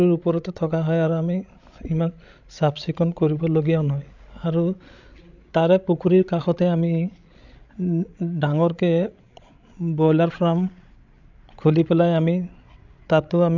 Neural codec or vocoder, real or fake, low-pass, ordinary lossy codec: codec, 16 kHz, 16 kbps, FreqCodec, larger model; fake; 7.2 kHz; none